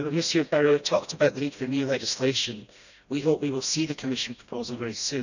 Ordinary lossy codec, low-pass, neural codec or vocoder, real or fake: none; 7.2 kHz; codec, 16 kHz, 1 kbps, FreqCodec, smaller model; fake